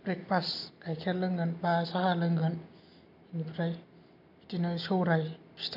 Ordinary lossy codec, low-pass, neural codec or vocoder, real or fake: AAC, 48 kbps; 5.4 kHz; none; real